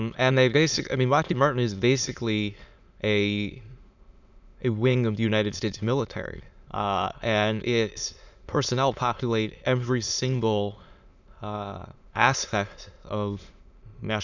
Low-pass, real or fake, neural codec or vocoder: 7.2 kHz; fake; autoencoder, 22.05 kHz, a latent of 192 numbers a frame, VITS, trained on many speakers